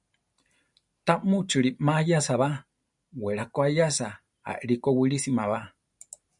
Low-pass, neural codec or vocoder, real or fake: 10.8 kHz; none; real